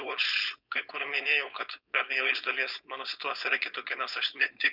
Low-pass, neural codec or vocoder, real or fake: 5.4 kHz; codec, 16 kHz, 4.8 kbps, FACodec; fake